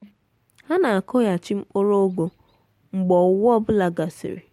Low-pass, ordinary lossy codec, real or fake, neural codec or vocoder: 19.8 kHz; MP3, 64 kbps; real; none